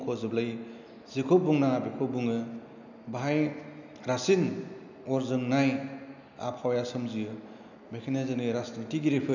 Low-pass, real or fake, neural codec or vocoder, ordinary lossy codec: 7.2 kHz; real; none; none